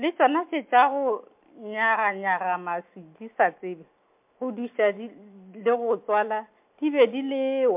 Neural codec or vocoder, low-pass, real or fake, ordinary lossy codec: none; 3.6 kHz; real; MP3, 32 kbps